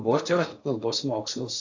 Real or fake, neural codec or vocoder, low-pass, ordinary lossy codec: fake; codec, 16 kHz in and 24 kHz out, 0.6 kbps, FocalCodec, streaming, 4096 codes; 7.2 kHz; AAC, 48 kbps